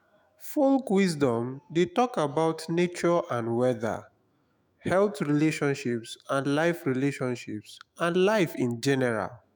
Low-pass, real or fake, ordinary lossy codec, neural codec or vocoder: none; fake; none; autoencoder, 48 kHz, 128 numbers a frame, DAC-VAE, trained on Japanese speech